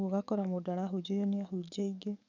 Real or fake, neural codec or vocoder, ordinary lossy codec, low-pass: fake; codec, 44.1 kHz, 7.8 kbps, DAC; none; 7.2 kHz